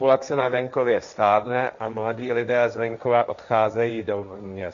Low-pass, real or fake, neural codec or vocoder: 7.2 kHz; fake; codec, 16 kHz, 1.1 kbps, Voila-Tokenizer